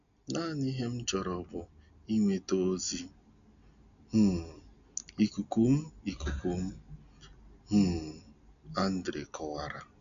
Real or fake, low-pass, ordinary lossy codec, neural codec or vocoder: real; 7.2 kHz; none; none